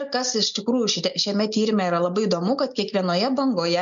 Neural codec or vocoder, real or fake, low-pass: none; real; 7.2 kHz